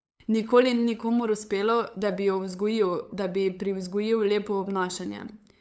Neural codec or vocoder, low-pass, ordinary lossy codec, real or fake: codec, 16 kHz, 8 kbps, FunCodec, trained on LibriTTS, 25 frames a second; none; none; fake